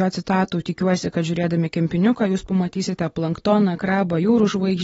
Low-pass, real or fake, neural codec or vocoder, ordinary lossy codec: 19.8 kHz; real; none; AAC, 24 kbps